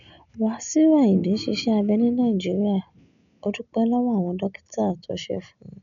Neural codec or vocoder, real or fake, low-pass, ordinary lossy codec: none; real; 7.2 kHz; none